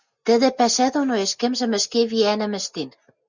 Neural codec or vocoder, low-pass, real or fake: none; 7.2 kHz; real